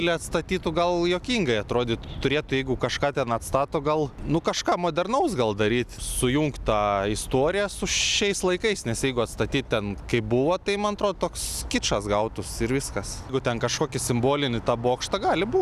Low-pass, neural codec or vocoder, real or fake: 14.4 kHz; none; real